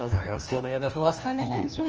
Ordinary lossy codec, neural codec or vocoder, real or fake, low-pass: Opus, 24 kbps; codec, 16 kHz, 1 kbps, FunCodec, trained on LibriTTS, 50 frames a second; fake; 7.2 kHz